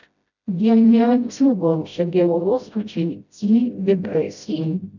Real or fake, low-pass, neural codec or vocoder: fake; 7.2 kHz; codec, 16 kHz, 0.5 kbps, FreqCodec, smaller model